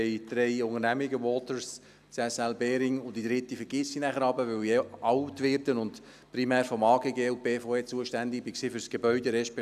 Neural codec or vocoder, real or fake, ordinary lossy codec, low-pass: none; real; none; 14.4 kHz